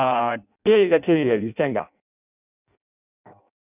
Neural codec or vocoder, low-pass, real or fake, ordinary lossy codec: codec, 16 kHz in and 24 kHz out, 0.6 kbps, FireRedTTS-2 codec; 3.6 kHz; fake; none